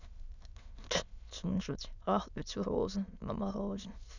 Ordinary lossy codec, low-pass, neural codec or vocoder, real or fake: none; 7.2 kHz; autoencoder, 22.05 kHz, a latent of 192 numbers a frame, VITS, trained on many speakers; fake